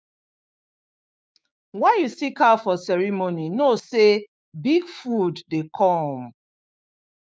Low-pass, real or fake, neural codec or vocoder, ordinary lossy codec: none; fake; codec, 16 kHz, 6 kbps, DAC; none